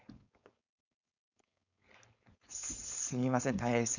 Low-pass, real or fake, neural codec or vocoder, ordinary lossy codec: 7.2 kHz; fake; codec, 16 kHz, 4.8 kbps, FACodec; none